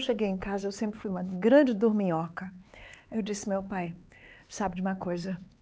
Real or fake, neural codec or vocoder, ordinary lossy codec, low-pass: fake; codec, 16 kHz, 4 kbps, X-Codec, HuBERT features, trained on LibriSpeech; none; none